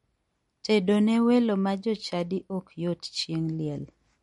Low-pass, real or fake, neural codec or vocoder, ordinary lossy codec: 19.8 kHz; real; none; MP3, 48 kbps